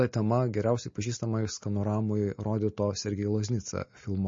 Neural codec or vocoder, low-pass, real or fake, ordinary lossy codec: none; 7.2 kHz; real; MP3, 32 kbps